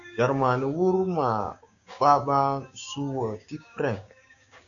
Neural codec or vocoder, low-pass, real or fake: codec, 16 kHz, 6 kbps, DAC; 7.2 kHz; fake